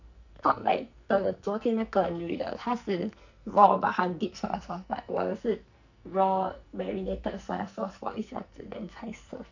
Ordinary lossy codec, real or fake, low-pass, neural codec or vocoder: none; fake; 7.2 kHz; codec, 44.1 kHz, 2.6 kbps, SNAC